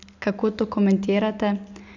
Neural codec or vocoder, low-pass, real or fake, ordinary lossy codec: none; 7.2 kHz; real; none